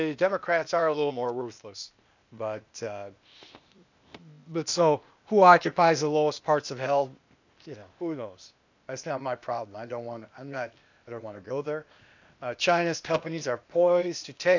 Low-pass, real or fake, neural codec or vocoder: 7.2 kHz; fake; codec, 16 kHz, 0.8 kbps, ZipCodec